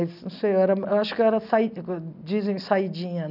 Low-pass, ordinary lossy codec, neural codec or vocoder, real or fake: 5.4 kHz; none; none; real